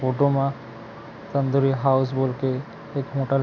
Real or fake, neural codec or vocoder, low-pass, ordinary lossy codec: real; none; 7.2 kHz; none